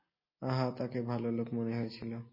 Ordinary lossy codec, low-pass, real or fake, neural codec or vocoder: MP3, 24 kbps; 5.4 kHz; real; none